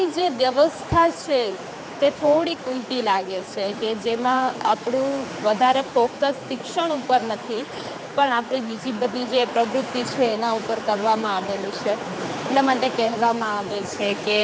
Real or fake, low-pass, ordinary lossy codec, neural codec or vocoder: fake; none; none; codec, 16 kHz, 4 kbps, X-Codec, HuBERT features, trained on general audio